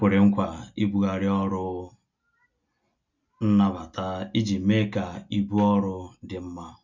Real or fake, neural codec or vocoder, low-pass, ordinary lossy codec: real; none; 7.2 kHz; none